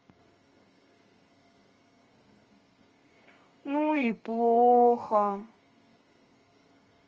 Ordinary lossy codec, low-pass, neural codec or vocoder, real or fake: Opus, 24 kbps; 7.2 kHz; codec, 32 kHz, 1.9 kbps, SNAC; fake